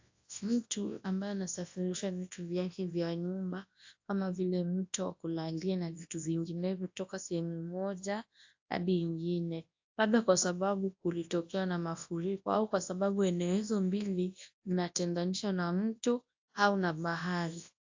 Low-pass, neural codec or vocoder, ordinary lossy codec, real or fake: 7.2 kHz; codec, 24 kHz, 0.9 kbps, WavTokenizer, large speech release; AAC, 48 kbps; fake